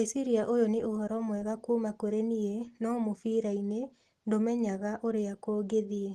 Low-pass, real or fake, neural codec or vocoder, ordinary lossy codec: 14.4 kHz; real; none; Opus, 16 kbps